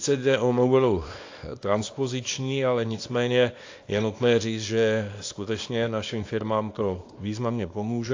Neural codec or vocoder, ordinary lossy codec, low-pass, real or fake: codec, 24 kHz, 0.9 kbps, WavTokenizer, small release; AAC, 48 kbps; 7.2 kHz; fake